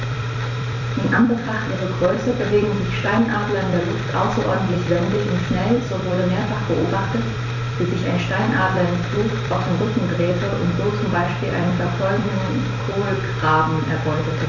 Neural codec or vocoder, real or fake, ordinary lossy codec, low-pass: vocoder, 44.1 kHz, 128 mel bands every 256 samples, BigVGAN v2; fake; none; 7.2 kHz